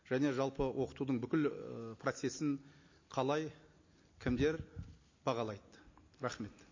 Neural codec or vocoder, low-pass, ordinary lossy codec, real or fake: none; 7.2 kHz; MP3, 32 kbps; real